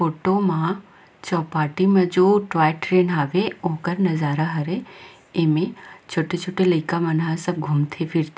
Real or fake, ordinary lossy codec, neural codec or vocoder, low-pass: real; none; none; none